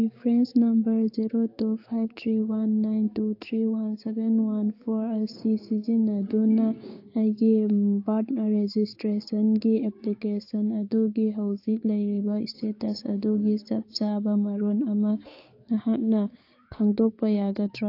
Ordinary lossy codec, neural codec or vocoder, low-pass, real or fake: AAC, 32 kbps; codec, 16 kHz, 6 kbps, DAC; 5.4 kHz; fake